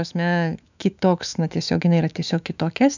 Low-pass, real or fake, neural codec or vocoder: 7.2 kHz; fake; autoencoder, 48 kHz, 128 numbers a frame, DAC-VAE, trained on Japanese speech